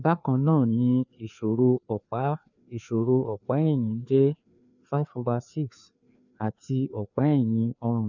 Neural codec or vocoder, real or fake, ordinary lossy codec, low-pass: codec, 16 kHz, 2 kbps, FreqCodec, larger model; fake; none; 7.2 kHz